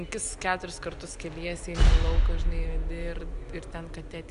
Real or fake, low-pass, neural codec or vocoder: real; 10.8 kHz; none